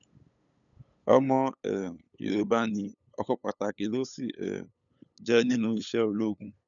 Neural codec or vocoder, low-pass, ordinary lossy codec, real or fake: codec, 16 kHz, 8 kbps, FunCodec, trained on LibriTTS, 25 frames a second; 7.2 kHz; none; fake